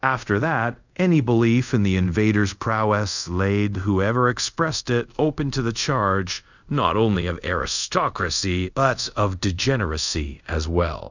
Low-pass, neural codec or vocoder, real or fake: 7.2 kHz; codec, 24 kHz, 0.5 kbps, DualCodec; fake